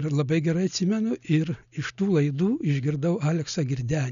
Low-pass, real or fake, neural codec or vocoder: 7.2 kHz; real; none